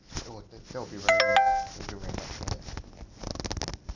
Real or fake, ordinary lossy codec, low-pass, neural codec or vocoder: real; none; 7.2 kHz; none